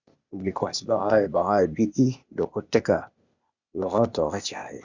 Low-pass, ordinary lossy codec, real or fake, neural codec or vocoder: 7.2 kHz; Opus, 64 kbps; fake; codec, 16 kHz, 0.8 kbps, ZipCodec